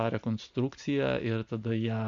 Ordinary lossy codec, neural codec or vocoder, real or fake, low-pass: MP3, 64 kbps; none; real; 7.2 kHz